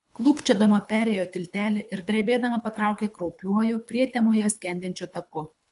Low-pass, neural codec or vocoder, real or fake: 10.8 kHz; codec, 24 kHz, 3 kbps, HILCodec; fake